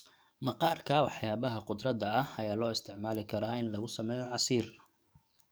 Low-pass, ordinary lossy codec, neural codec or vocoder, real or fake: none; none; codec, 44.1 kHz, 7.8 kbps, DAC; fake